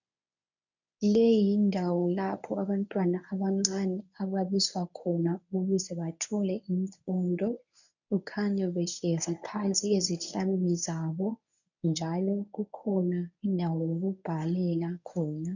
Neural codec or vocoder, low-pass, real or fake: codec, 24 kHz, 0.9 kbps, WavTokenizer, medium speech release version 1; 7.2 kHz; fake